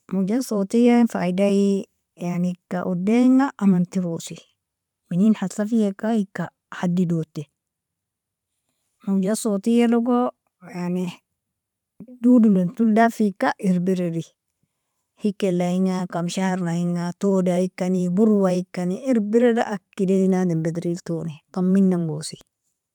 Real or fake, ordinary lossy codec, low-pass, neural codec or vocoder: fake; none; 19.8 kHz; vocoder, 44.1 kHz, 128 mel bands every 512 samples, BigVGAN v2